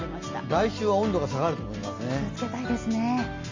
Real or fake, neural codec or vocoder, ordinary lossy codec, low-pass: real; none; Opus, 32 kbps; 7.2 kHz